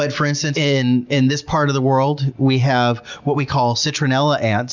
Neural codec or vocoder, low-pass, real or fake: none; 7.2 kHz; real